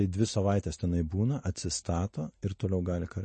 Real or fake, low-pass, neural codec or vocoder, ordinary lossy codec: real; 10.8 kHz; none; MP3, 32 kbps